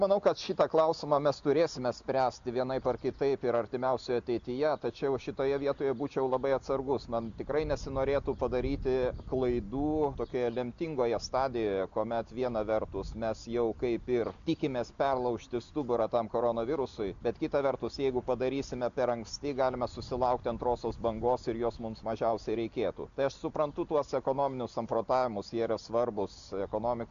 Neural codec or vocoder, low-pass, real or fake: none; 7.2 kHz; real